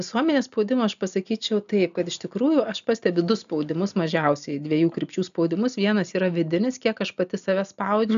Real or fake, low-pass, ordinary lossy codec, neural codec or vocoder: real; 7.2 kHz; AAC, 96 kbps; none